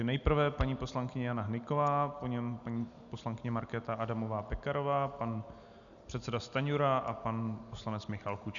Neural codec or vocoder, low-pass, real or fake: none; 7.2 kHz; real